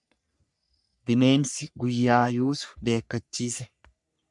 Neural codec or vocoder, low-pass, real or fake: codec, 44.1 kHz, 3.4 kbps, Pupu-Codec; 10.8 kHz; fake